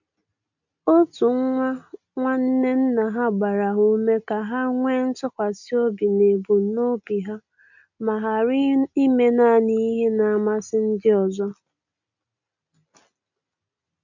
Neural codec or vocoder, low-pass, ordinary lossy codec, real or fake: none; 7.2 kHz; none; real